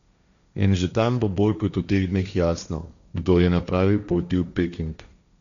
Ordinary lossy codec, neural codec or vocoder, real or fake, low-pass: none; codec, 16 kHz, 1.1 kbps, Voila-Tokenizer; fake; 7.2 kHz